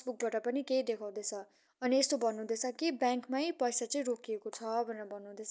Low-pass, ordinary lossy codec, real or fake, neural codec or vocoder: none; none; real; none